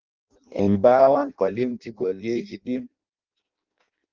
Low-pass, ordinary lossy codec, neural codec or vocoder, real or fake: 7.2 kHz; Opus, 32 kbps; codec, 16 kHz in and 24 kHz out, 0.6 kbps, FireRedTTS-2 codec; fake